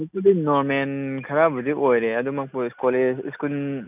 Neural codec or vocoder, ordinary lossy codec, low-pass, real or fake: none; none; 3.6 kHz; real